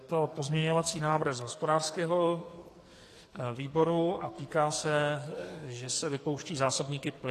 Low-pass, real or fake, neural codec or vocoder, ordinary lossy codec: 14.4 kHz; fake; codec, 44.1 kHz, 2.6 kbps, SNAC; AAC, 48 kbps